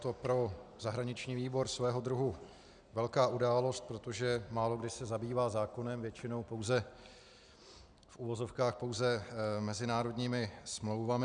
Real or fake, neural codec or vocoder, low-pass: real; none; 9.9 kHz